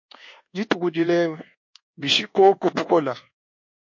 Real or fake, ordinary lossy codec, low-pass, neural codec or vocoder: fake; MP3, 48 kbps; 7.2 kHz; codec, 24 kHz, 1.2 kbps, DualCodec